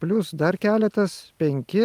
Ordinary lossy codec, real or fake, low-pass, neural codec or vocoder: Opus, 32 kbps; real; 14.4 kHz; none